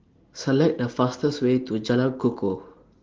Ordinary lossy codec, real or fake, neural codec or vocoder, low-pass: Opus, 16 kbps; real; none; 7.2 kHz